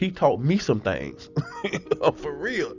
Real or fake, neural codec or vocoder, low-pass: real; none; 7.2 kHz